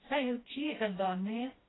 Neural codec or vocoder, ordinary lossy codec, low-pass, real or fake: codec, 16 kHz, 1 kbps, FreqCodec, smaller model; AAC, 16 kbps; 7.2 kHz; fake